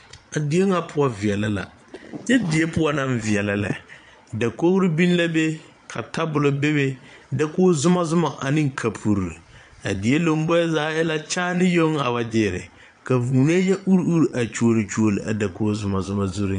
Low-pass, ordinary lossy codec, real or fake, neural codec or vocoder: 9.9 kHz; MP3, 64 kbps; fake; vocoder, 22.05 kHz, 80 mel bands, Vocos